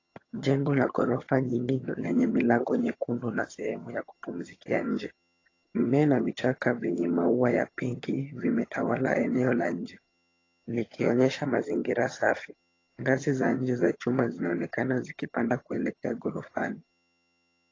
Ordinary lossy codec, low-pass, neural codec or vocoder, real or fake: AAC, 32 kbps; 7.2 kHz; vocoder, 22.05 kHz, 80 mel bands, HiFi-GAN; fake